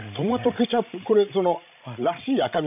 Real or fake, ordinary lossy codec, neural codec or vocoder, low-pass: fake; none; codec, 16 kHz, 16 kbps, FreqCodec, larger model; 3.6 kHz